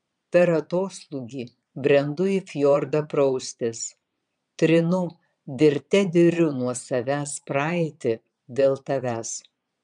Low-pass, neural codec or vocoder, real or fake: 9.9 kHz; vocoder, 22.05 kHz, 80 mel bands, Vocos; fake